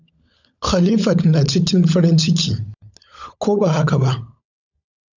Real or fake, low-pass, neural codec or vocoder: fake; 7.2 kHz; codec, 16 kHz, 16 kbps, FunCodec, trained on LibriTTS, 50 frames a second